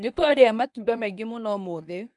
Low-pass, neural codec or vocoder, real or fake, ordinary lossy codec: 10.8 kHz; codec, 24 kHz, 0.9 kbps, WavTokenizer, medium speech release version 1; fake; none